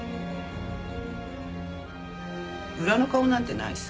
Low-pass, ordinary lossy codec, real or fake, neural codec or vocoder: none; none; real; none